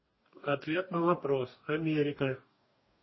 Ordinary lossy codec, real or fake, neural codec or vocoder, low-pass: MP3, 24 kbps; fake; codec, 44.1 kHz, 2.6 kbps, DAC; 7.2 kHz